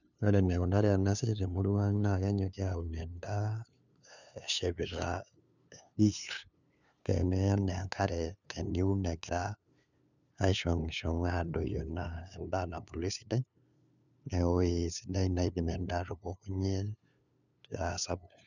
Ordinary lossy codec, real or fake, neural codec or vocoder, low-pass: none; fake; codec, 16 kHz, 2 kbps, FunCodec, trained on LibriTTS, 25 frames a second; 7.2 kHz